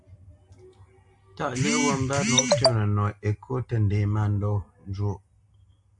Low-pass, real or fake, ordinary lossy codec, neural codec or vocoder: 10.8 kHz; real; AAC, 64 kbps; none